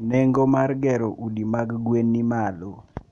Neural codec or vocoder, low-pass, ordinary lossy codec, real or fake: none; 10.8 kHz; none; real